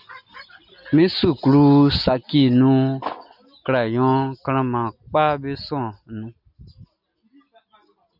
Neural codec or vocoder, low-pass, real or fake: none; 5.4 kHz; real